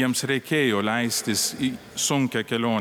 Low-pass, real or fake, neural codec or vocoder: 19.8 kHz; real; none